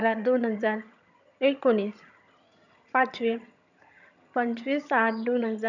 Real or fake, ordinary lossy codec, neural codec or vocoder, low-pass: fake; none; vocoder, 22.05 kHz, 80 mel bands, HiFi-GAN; 7.2 kHz